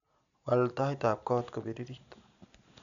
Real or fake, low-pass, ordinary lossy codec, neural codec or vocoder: real; 7.2 kHz; none; none